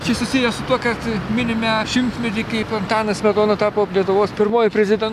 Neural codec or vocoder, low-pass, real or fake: none; 14.4 kHz; real